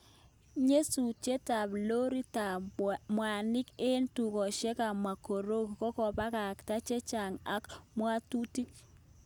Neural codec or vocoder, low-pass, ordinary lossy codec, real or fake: none; none; none; real